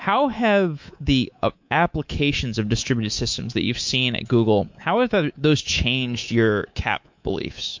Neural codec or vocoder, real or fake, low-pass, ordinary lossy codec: codec, 24 kHz, 3.1 kbps, DualCodec; fake; 7.2 kHz; MP3, 48 kbps